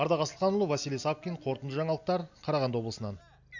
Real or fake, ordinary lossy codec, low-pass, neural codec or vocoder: real; none; 7.2 kHz; none